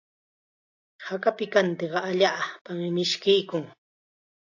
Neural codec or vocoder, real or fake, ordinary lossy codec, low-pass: none; real; AAC, 48 kbps; 7.2 kHz